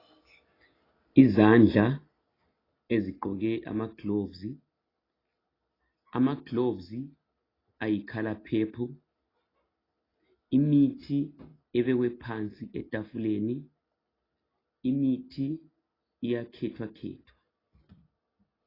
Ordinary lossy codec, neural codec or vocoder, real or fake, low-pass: AAC, 24 kbps; none; real; 5.4 kHz